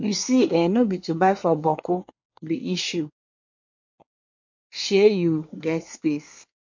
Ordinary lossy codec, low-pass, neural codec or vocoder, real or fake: MP3, 48 kbps; 7.2 kHz; codec, 24 kHz, 1 kbps, SNAC; fake